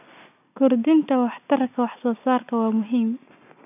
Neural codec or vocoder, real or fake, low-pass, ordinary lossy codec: none; real; 3.6 kHz; none